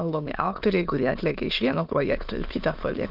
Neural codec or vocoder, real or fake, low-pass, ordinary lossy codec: autoencoder, 22.05 kHz, a latent of 192 numbers a frame, VITS, trained on many speakers; fake; 5.4 kHz; Opus, 24 kbps